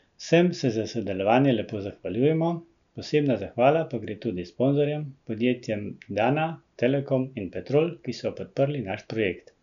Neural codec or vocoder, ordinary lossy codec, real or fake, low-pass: none; none; real; 7.2 kHz